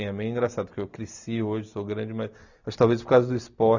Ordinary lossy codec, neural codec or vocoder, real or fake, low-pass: none; none; real; 7.2 kHz